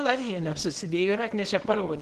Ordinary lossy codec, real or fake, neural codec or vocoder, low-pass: Opus, 16 kbps; fake; codec, 24 kHz, 0.9 kbps, WavTokenizer, small release; 10.8 kHz